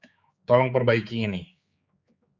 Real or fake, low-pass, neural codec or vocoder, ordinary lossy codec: fake; 7.2 kHz; codec, 16 kHz, 4 kbps, X-Codec, HuBERT features, trained on general audio; Opus, 64 kbps